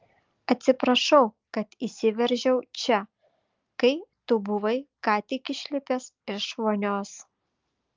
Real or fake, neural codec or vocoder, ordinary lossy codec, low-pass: real; none; Opus, 24 kbps; 7.2 kHz